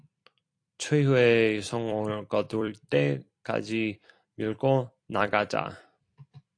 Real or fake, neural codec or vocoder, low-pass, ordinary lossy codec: fake; vocoder, 44.1 kHz, 128 mel bands every 256 samples, BigVGAN v2; 9.9 kHz; AAC, 48 kbps